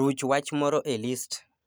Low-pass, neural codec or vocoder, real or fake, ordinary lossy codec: none; none; real; none